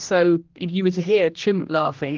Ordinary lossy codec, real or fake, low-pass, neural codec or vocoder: Opus, 24 kbps; fake; 7.2 kHz; codec, 16 kHz, 1 kbps, X-Codec, HuBERT features, trained on general audio